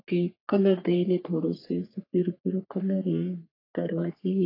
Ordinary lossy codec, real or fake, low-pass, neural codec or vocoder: AAC, 24 kbps; fake; 5.4 kHz; codec, 44.1 kHz, 3.4 kbps, Pupu-Codec